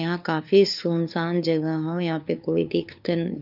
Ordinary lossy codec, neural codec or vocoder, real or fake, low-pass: none; codec, 16 kHz, 2 kbps, FunCodec, trained on LibriTTS, 25 frames a second; fake; 5.4 kHz